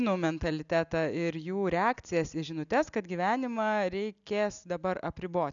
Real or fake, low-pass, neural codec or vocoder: real; 7.2 kHz; none